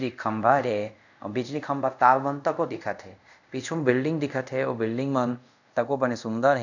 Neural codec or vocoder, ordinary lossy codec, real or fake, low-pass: codec, 24 kHz, 0.5 kbps, DualCodec; none; fake; 7.2 kHz